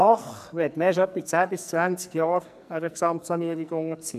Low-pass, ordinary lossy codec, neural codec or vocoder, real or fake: 14.4 kHz; none; codec, 44.1 kHz, 2.6 kbps, SNAC; fake